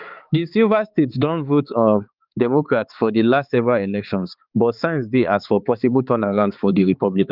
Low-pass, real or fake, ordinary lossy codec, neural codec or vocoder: 5.4 kHz; fake; Opus, 32 kbps; codec, 16 kHz, 4 kbps, X-Codec, HuBERT features, trained on balanced general audio